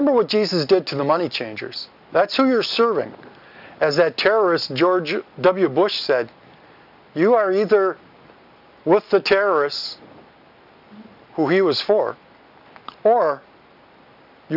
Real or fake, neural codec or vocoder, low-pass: real; none; 5.4 kHz